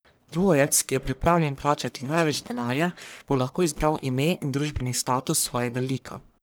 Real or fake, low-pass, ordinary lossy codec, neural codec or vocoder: fake; none; none; codec, 44.1 kHz, 1.7 kbps, Pupu-Codec